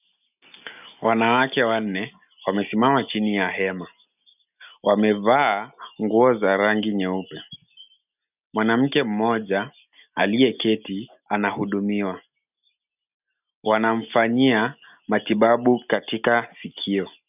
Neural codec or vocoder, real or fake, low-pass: none; real; 3.6 kHz